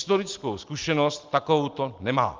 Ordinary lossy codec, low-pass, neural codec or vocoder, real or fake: Opus, 24 kbps; 7.2 kHz; none; real